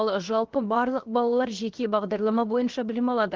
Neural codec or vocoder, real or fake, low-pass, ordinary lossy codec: codec, 16 kHz, 0.7 kbps, FocalCodec; fake; 7.2 kHz; Opus, 16 kbps